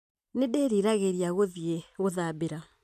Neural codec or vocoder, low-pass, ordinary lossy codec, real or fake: none; 14.4 kHz; MP3, 96 kbps; real